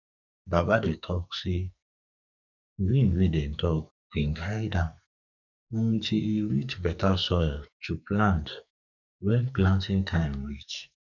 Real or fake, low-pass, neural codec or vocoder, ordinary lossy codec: fake; 7.2 kHz; codec, 44.1 kHz, 2.6 kbps, SNAC; none